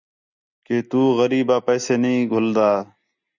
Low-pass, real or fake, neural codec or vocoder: 7.2 kHz; real; none